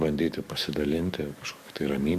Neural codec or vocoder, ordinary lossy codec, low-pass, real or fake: codec, 44.1 kHz, 7.8 kbps, DAC; Opus, 64 kbps; 14.4 kHz; fake